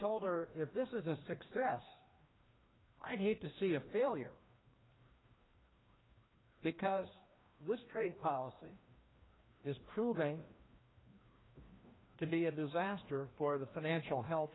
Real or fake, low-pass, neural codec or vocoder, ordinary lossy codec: fake; 7.2 kHz; codec, 16 kHz, 1 kbps, FreqCodec, larger model; AAC, 16 kbps